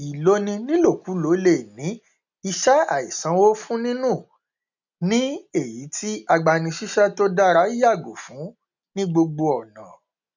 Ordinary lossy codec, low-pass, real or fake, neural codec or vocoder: none; 7.2 kHz; real; none